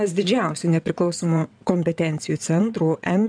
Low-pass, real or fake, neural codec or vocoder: 9.9 kHz; fake; vocoder, 22.05 kHz, 80 mel bands, WaveNeXt